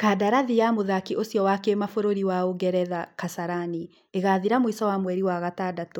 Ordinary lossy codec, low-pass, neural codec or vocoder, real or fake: none; 19.8 kHz; none; real